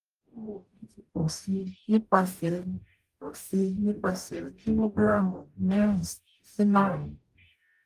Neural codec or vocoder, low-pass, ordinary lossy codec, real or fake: codec, 44.1 kHz, 0.9 kbps, DAC; 14.4 kHz; Opus, 32 kbps; fake